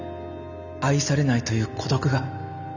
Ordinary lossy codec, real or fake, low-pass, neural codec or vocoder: none; real; 7.2 kHz; none